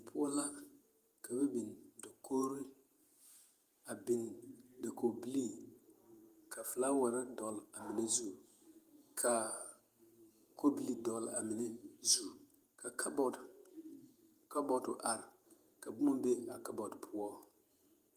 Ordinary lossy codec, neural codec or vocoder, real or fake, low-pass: Opus, 32 kbps; vocoder, 48 kHz, 128 mel bands, Vocos; fake; 14.4 kHz